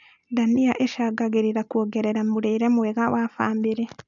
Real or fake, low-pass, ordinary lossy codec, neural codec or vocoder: real; 7.2 kHz; none; none